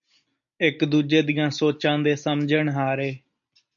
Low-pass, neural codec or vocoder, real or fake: 7.2 kHz; none; real